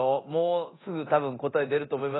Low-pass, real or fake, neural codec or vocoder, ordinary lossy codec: 7.2 kHz; fake; codec, 24 kHz, 0.9 kbps, DualCodec; AAC, 16 kbps